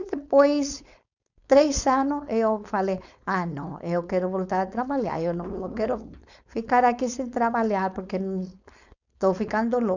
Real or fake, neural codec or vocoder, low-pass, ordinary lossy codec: fake; codec, 16 kHz, 4.8 kbps, FACodec; 7.2 kHz; none